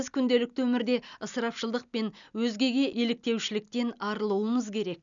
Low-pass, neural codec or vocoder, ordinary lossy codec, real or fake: 7.2 kHz; none; none; real